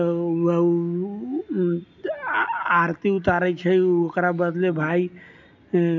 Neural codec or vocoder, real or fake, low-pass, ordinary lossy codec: none; real; 7.2 kHz; none